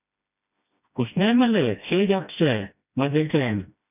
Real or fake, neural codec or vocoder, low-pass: fake; codec, 16 kHz, 1 kbps, FreqCodec, smaller model; 3.6 kHz